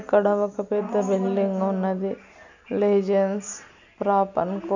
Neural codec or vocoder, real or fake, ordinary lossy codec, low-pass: none; real; none; 7.2 kHz